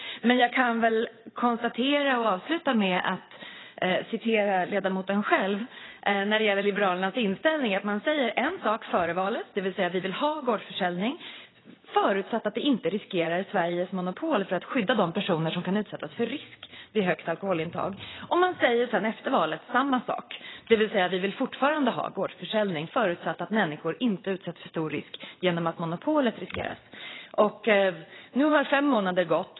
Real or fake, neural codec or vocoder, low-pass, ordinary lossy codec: fake; vocoder, 22.05 kHz, 80 mel bands, WaveNeXt; 7.2 kHz; AAC, 16 kbps